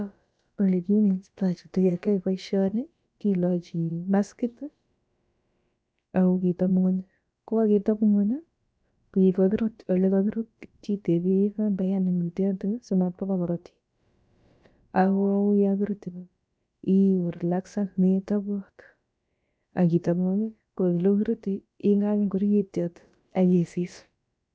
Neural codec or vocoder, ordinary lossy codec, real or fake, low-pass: codec, 16 kHz, about 1 kbps, DyCAST, with the encoder's durations; none; fake; none